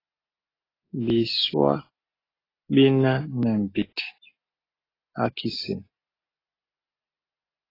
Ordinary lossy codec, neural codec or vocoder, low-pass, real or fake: AAC, 24 kbps; none; 5.4 kHz; real